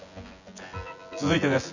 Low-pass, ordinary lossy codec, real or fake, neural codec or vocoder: 7.2 kHz; none; fake; vocoder, 24 kHz, 100 mel bands, Vocos